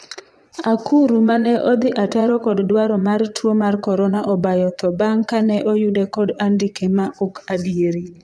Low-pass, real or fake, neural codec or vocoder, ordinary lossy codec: none; fake; vocoder, 22.05 kHz, 80 mel bands, Vocos; none